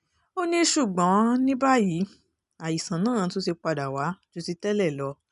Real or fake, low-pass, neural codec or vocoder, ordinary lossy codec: real; 10.8 kHz; none; none